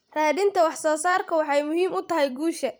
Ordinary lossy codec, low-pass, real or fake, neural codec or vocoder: none; none; fake; vocoder, 44.1 kHz, 128 mel bands every 512 samples, BigVGAN v2